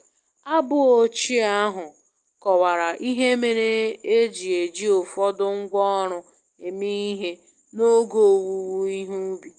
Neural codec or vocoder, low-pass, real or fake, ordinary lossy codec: none; 9.9 kHz; real; Opus, 24 kbps